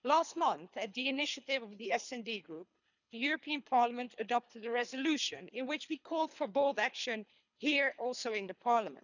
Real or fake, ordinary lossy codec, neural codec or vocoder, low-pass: fake; none; codec, 24 kHz, 3 kbps, HILCodec; 7.2 kHz